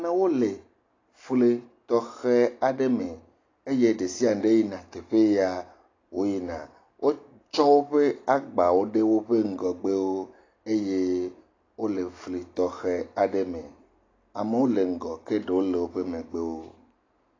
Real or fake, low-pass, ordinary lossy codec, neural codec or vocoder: real; 7.2 kHz; AAC, 32 kbps; none